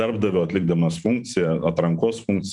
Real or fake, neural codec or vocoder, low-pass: fake; vocoder, 44.1 kHz, 128 mel bands every 512 samples, BigVGAN v2; 10.8 kHz